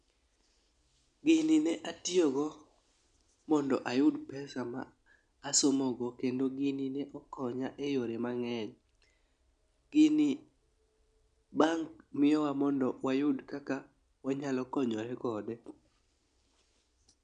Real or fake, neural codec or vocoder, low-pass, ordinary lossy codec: real; none; 9.9 kHz; none